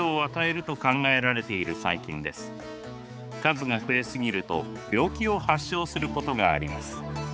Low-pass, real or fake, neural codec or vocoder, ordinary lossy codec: none; fake; codec, 16 kHz, 4 kbps, X-Codec, HuBERT features, trained on balanced general audio; none